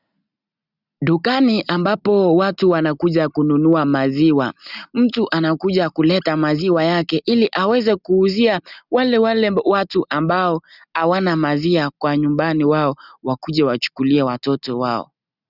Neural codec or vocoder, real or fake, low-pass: none; real; 5.4 kHz